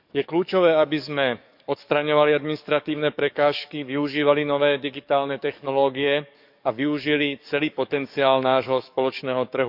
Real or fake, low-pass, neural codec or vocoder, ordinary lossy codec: fake; 5.4 kHz; codec, 44.1 kHz, 7.8 kbps, DAC; none